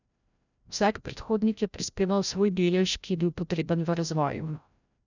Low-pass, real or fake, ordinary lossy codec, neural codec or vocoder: 7.2 kHz; fake; none; codec, 16 kHz, 0.5 kbps, FreqCodec, larger model